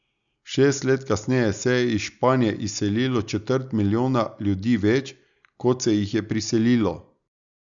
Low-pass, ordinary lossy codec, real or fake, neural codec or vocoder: 7.2 kHz; none; real; none